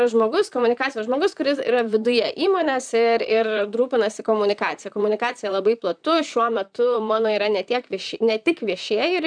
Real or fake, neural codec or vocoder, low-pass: fake; vocoder, 44.1 kHz, 128 mel bands, Pupu-Vocoder; 9.9 kHz